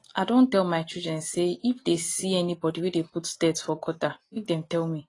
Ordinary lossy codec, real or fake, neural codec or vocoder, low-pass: AAC, 32 kbps; real; none; 10.8 kHz